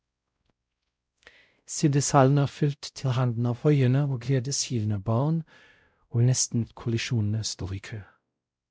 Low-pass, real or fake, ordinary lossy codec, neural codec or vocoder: none; fake; none; codec, 16 kHz, 0.5 kbps, X-Codec, WavLM features, trained on Multilingual LibriSpeech